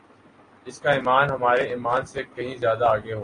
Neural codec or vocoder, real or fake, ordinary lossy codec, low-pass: none; real; AAC, 32 kbps; 9.9 kHz